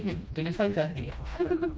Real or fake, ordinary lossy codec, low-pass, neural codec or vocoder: fake; none; none; codec, 16 kHz, 1 kbps, FreqCodec, smaller model